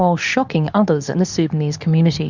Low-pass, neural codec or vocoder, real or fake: 7.2 kHz; codec, 24 kHz, 0.9 kbps, WavTokenizer, medium speech release version 2; fake